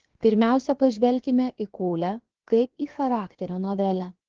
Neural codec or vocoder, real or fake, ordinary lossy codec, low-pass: codec, 16 kHz, 0.7 kbps, FocalCodec; fake; Opus, 16 kbps; 7.2 kHz